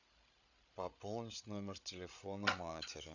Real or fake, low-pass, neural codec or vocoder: fake; 7.2 kHz; codec, 16 kHz, 8 kbps, FreqCodec, larger model